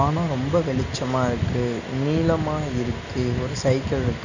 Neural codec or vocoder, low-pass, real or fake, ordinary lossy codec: none; 7.2 kHz; real; none